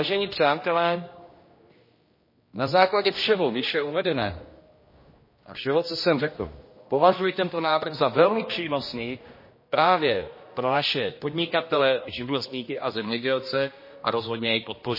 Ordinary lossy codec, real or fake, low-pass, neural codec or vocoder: MP3, 24 kbps; fake; 5.4 kHz; codec, 16 kHz, 1 kbps, X-Codec, HuBERT features, trained on general audio